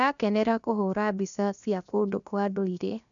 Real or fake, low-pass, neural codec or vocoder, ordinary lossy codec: fake; 7.2 kHz; codec, 16 kHz, 0.7 kbps, FocalCodec; none